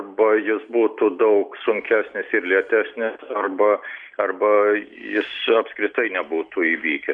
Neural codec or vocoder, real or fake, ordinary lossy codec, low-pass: none; real; Opus, 64 kbps; 9.9 kHz